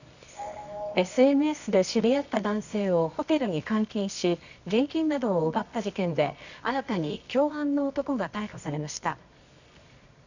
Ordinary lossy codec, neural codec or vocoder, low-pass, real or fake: none; codec, 24 kHz, 0.9 kbps, WavTokenizer, medium music audio release; 7.2 kHz; fake